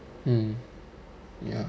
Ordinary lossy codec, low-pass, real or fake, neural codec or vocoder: none; none; real; none